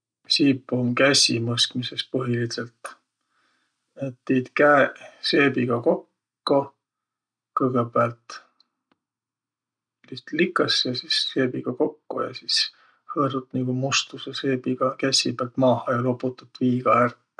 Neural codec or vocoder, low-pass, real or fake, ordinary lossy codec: none; 9.9 kHz; real; none